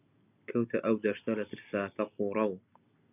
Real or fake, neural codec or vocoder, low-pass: real; none; 3.6 kHz